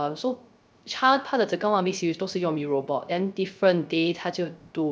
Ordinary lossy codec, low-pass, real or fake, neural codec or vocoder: none; none; fake; codec, 16 kHz, 0.3 kbps, FocalCodec